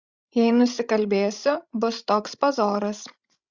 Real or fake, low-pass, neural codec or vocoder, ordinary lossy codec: fake; 7.2 kHz; codec, 16 kHz, 16 kbps, FreqCodec, larger model; Opus, 64 kbps